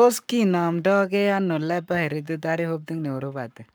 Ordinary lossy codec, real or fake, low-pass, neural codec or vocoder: none; fake; none; codec, 44.1 kHz, 7.8 kbps, Pupu-Codec